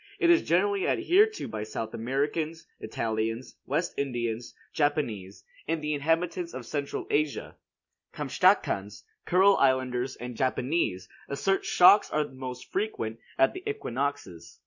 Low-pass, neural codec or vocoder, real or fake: 7.2 kHz; none; real